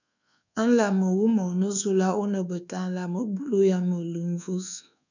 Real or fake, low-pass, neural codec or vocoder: fake; 7.2 kHz; codec, 24 kHz, 1.2 kbps, DualCodec